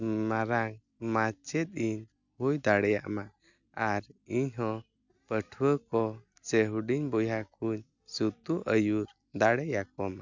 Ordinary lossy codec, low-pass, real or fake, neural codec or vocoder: none; 7.2 kHz; real; none